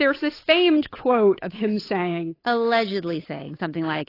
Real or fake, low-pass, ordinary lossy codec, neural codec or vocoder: fake; 5.4 kHz; AAC, 24 kbps; codec, 16 kHz, 8 kbps, FunCodec, trained on Chinese and English, 25 frames a second